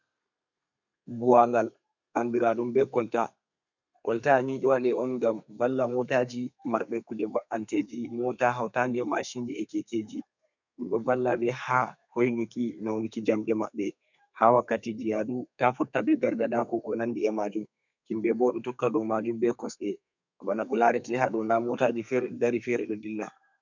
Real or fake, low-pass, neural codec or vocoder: fake; 7.2 kHz; codec, 32 kHz, 1.9 kbps, SNAC